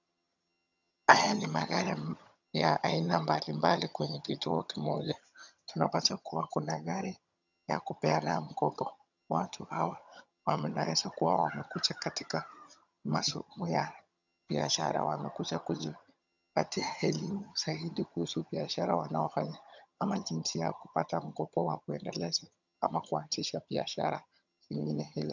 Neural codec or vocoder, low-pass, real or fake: vocoder, 22.05 kHz, 80 mel bands, HiFi-GAN; 7.2 kHz; fake